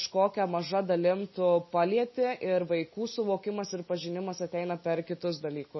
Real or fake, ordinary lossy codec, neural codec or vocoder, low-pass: real; MP3, 24 kbps; none; 7.2 kHz